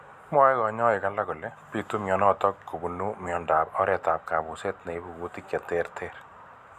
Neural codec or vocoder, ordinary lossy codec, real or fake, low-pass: none; none; real; 14.4 kHz